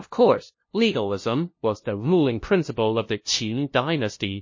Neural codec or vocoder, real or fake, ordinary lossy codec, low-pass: codec, 16 kHz, 0.5 kbps, FunCodec, trained on LibriTTS, 25 frames a second; fake; MP3, 32 kbps; 7.2 kHz